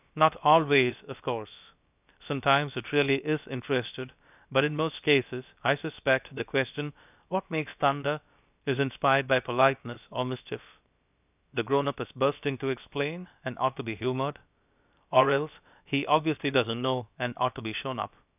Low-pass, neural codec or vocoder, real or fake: 3.6 kHz; codec, 16 kHz, about 1 kbps, DyCAST, with the encoder's durations; fake